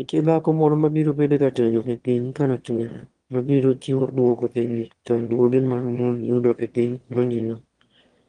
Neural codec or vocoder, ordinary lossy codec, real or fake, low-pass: autoencoder, 22.05 kHz, a latent of 192 numbers a frame, VITS, trained on one speaker; Opus, 24 kbps; fake; 9.9 kHz